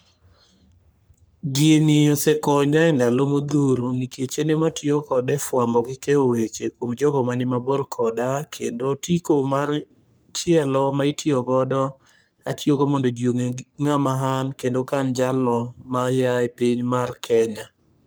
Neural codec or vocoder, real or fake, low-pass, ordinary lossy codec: codec, 44.1 kHz, 3.4 kbps, Pupu-Codec; fake; none; none